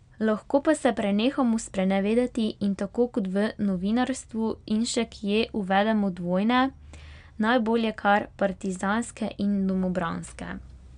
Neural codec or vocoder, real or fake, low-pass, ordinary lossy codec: none; real; 9.9 kHz; MP3, 96 kbps